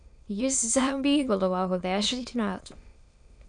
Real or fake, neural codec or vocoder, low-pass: fake; autoencoder, 22.05 kHz, a latent of 192 numbers a frame, VITS, trained on many speakers; 9.9 kHz